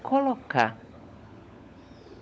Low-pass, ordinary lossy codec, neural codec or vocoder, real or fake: none; none; codec, 16 kHz, 16 kbps, FunCodec, trained on LibriTTS, 50 frames a second; fake